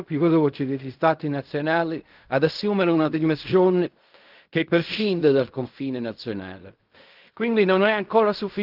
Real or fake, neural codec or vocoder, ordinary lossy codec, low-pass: fake; codec, 16 kHz in and 24 kHz out, 0.4 kbps, LongCat-Audio-Codec, fine tuned four codebook decoder; Opus, 24 kbps; 5.4 kHz